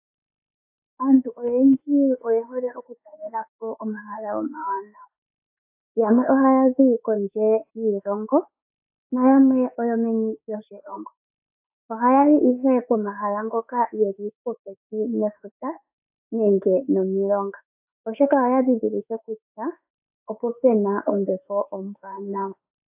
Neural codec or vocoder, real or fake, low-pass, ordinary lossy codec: autoencoder, 48 kHz, 32 numbers a frame, DAC-VAE, trained on Japanese speech; fake; 3.6 kHz; AAC, 32 kbps